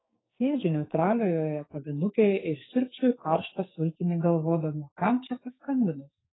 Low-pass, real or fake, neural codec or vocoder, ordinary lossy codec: 7.2 kHz; fake; codec, 44.1 kHz, 2.6 kbps, SNAC; AAC, 16 kbps